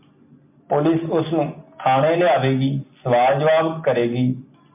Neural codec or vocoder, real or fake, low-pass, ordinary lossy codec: none; real; 3.6 kHz; MP3, 32 kbps